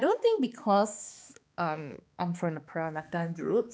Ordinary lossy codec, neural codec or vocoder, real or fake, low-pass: none; codec, 16 kHz, 2 kbps, X-Codec, HuBERT features, trained on balanced general audio; fake; none